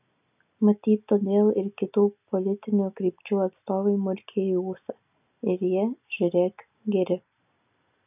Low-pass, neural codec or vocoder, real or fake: 3.6 kHz; none; real